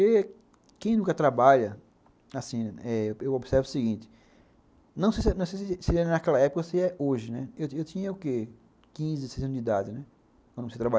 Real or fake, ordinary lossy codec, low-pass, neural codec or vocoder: real; none; none; none